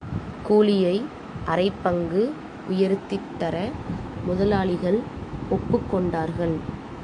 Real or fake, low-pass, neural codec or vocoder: fake; 10.8 kHz; autoencoder, 48 kHz, 128 numbers a frame, DAC-VAE, trained on Japanese speech